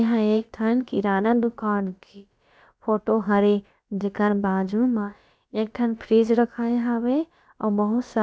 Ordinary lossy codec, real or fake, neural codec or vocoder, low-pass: none; fake; codec, 16 kHz, about 1 kbps, DyCAST, with the encoder's durations; none